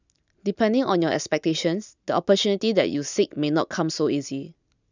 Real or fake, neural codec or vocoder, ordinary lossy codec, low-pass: real; none; none; 7.2 kHz